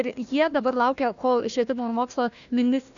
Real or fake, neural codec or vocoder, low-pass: fake; codec, 16 kHz, 1 kbps, FunCodec, trained on Chinese and English, 50 frames a second; 7.2 kHz